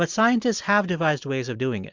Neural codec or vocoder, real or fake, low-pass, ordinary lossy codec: none; real; 7.2 kHz; MP3, 64 kbps